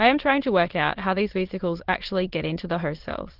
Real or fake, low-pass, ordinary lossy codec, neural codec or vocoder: fake; 5.4 kHz; Opus, 16 kbps; autoencoder, 22.05 kHz, a latent of 192 numbers a frame, VITS, trained on many speakers